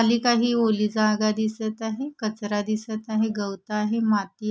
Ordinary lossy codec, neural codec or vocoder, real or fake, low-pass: none; none; real; none